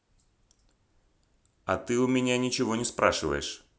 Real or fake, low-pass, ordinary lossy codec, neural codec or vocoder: real; none; none; none